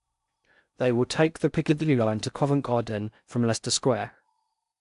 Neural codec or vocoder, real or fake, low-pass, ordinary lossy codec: codec, 16 kHz in and 24 kHz out, 0.6 kbps, FocalCodec, streaming, 4096 codes; fake; 10.8 kHz; none